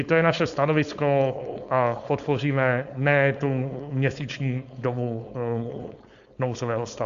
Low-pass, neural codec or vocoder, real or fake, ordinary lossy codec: 7.2 kHz; codec, 16 kHz, 4.8 kbps, FACodec; fake; Opus, 64 kbps